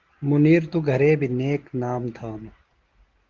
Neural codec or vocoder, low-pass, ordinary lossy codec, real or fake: none; 7.2 kHz; Opus, 16 kbps; real